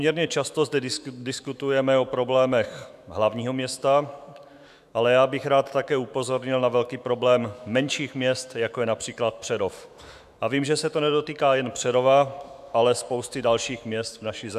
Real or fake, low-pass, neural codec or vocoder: fake; 14.4 kHz; autoencoder, 48 kHz, 128 numbers a frame, DAC-VAE, trained on Japanese speech